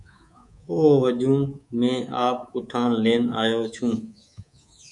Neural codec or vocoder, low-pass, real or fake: codec, 24 kHz, 3.1 kbps, DualCodec; 10.8 kHz; fake